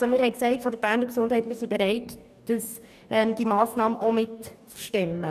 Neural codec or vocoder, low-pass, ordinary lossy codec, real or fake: codec, 44.1 kHz, 2.6 kbps, DAC; 14.4 kHz; none; fake